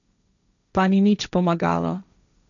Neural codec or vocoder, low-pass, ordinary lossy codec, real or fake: codec, 16 kHz, 1.1 kbps, Voila-Tokenizer; 7.2 kHz; none; fake